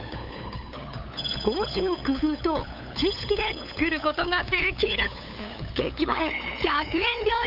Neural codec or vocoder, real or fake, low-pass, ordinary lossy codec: codec, 16 kHz, 8 kbps, FunCodec, trained on LibriTTS, 25 frames a second; fake; 5.4 kHz; none